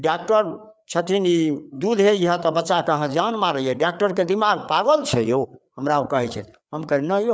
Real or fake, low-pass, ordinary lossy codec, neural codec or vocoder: fake; none; none; codec, 16 kHz, 4 kbps, FreqCodec, larger model